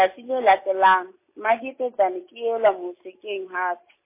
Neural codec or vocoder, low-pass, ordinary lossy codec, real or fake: none; 3.6 kHz; MP3, 24 kbps; real